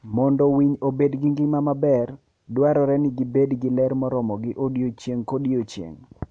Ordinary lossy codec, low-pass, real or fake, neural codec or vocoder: MP3, 64 kbps; 9.9 kHz; real; none